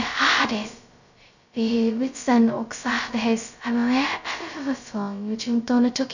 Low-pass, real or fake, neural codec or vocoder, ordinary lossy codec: 7.2 kHz; fake; codec, 16 kHz, 0.2 kbps, FocalCodec; none